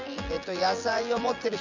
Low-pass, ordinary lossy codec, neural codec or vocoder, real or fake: 7.2 kHz; none; vocoder, 24 kHz, 100 mel bands, Vocos; fake